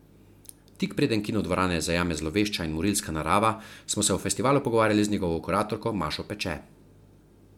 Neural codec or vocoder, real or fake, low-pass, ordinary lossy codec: none; real; 19.8 kHz; MP3, 96 kbps